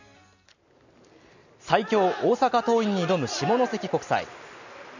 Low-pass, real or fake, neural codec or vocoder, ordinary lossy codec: 7.2 kHz; real; none; none